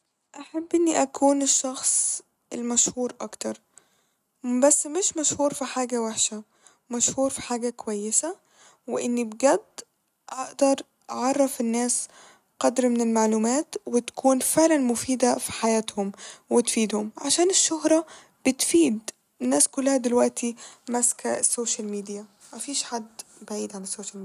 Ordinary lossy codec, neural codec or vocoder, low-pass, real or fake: none; none; 14.4 kHz; real